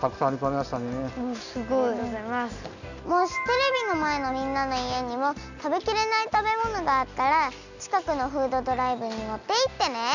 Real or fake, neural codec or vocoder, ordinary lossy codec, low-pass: real; none; none; 7.2 kHz